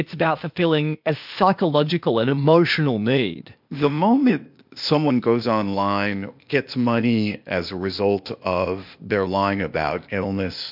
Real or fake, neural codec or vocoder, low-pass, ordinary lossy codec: fake; codec, 16 kHz, 0.8 kbps, ZipCodec; 5.4 kHz; MP3, 48 kbps